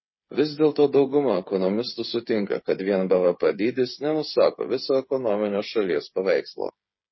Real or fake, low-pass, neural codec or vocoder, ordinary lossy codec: fake; 7.2 kHz; codec, 16 kHz, 8 kbps, FreqCodec, smaller model; MP3, 24 kbps